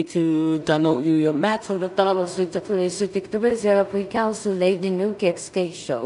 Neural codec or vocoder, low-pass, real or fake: codec, 16 kHz in and 24 kHz out, 0.4 kbps, LongCat-Audio-Codec, two codebook decoder; 10.8 kHz; fake